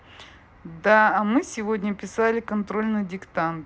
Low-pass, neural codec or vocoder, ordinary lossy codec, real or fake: none; none; none; real